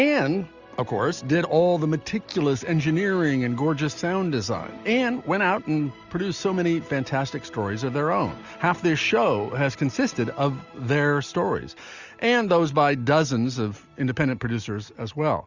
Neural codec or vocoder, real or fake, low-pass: none; real; 7.2 kHz